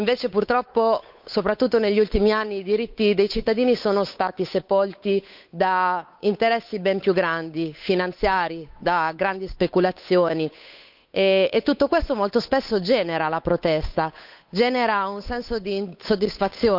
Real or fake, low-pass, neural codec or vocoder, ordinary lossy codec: fake; 5.4 kHz; codec, 16 kHz, 8 kbps, FunCodec, trained on Chinese and English, 25 frames a second; none